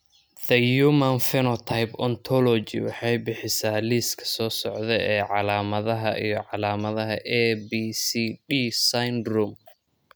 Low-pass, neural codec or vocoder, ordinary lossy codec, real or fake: none; none; none; real